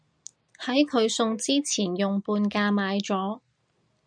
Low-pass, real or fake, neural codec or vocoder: 9.9 kHz; real; none